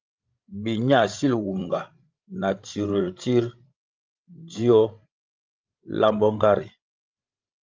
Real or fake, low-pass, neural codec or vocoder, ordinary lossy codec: fake; 7.2 kHz; codec, 16 kHz, 16 kbps, FreqCodec, larger model; Opus, 24 kbps